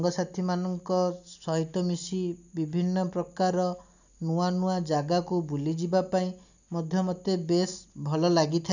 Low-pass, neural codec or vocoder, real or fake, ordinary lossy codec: 7.2 kHz; none; real; none